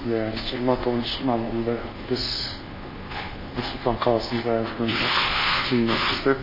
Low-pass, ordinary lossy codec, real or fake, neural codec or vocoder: 5.4 kHz; MP3, 24 kbps; fake; codec, 24 kHz, 1.2 kbps, DualCodec